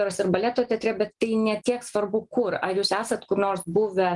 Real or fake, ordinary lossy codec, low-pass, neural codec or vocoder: real; Opus, 24 kbps; 10.8 kHz; none